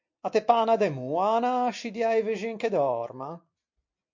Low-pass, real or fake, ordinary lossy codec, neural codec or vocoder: 7.2 kHz; real; MP3, 48 kbps; none